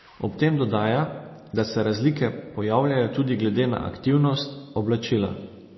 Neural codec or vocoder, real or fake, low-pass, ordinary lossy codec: none; real; 7.2 kHz; MP3, 24 kbps